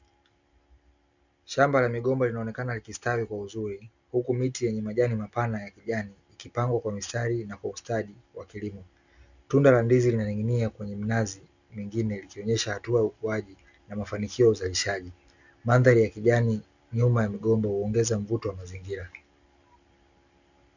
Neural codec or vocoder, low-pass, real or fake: none; 7.2 kHz; real